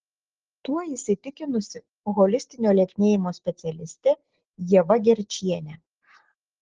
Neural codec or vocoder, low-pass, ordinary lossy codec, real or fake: none; 7.2 kHz; Opus, 16 kbps; real